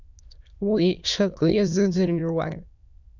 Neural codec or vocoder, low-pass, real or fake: autoencoder, 22.05 kHz, a latent of 192 numbers a frame, VITS, trained on many speakers; 7.2 kHz; fake